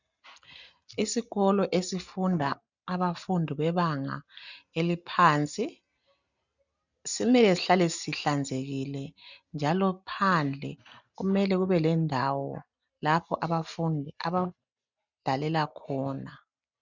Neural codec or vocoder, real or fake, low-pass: none; real; 7.2 kHz